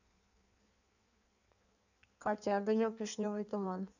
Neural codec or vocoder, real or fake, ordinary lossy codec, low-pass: codec, 16 kHz in and 24 kHz out, 1.1 kbps, FireRedTTS-2 codec; fake; none; 7.2 kHz